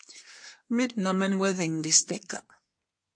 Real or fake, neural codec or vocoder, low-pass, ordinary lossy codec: fake; codec, 24 kHz, 1 kbps, SNAC; 9.9 kHz; MP3, 48 kbps